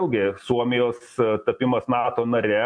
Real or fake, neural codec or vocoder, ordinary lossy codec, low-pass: real; none; MP3, 48 kbps; 9.9 kHz